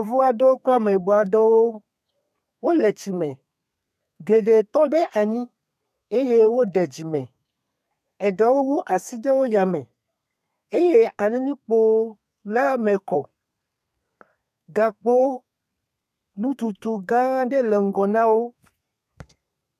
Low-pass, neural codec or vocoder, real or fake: 14.4 kHz; codec, 32 kHz, 1.9 kbps, SNAC; fake